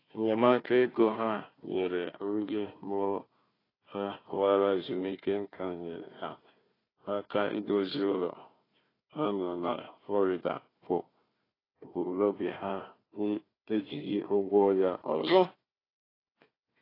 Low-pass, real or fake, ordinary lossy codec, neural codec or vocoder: 5.4 kHz; fake; AAC, 24 kbps; codec, 16 kHz, 1 kbps, FunCodec, trained on Chinese and English, 50 frames a second